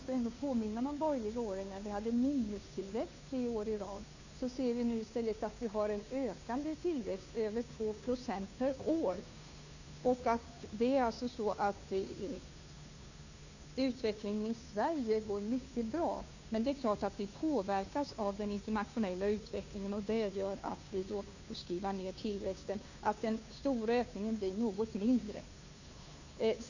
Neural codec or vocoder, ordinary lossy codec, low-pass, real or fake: codec, 16 kHz, 2 kbps, FunCodec, trained on Chinese and English, 25 frames a second; none; 7.2 kHz; fake